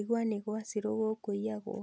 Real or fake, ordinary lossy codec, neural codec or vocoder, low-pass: real; none; none; none